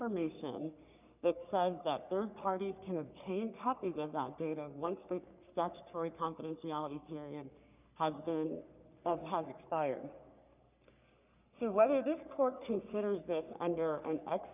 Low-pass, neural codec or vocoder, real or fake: 3.6 kHz; codec, 44.1 kHz, 3.4 kbps, Pupu-Codec; fake